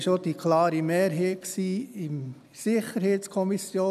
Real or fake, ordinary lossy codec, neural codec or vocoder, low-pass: real; none; none; 14.4 kHz